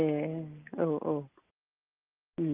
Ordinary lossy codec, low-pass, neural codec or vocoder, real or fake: Opus, 24 kbps; 3.6 kHz; none; real